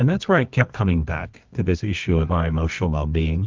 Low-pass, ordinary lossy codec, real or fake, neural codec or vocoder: 7.2 kHz; Opus, 32 kbps; fake; codec, 24 kHz, 0.9 kbps, WavTokenizer, medium music audio release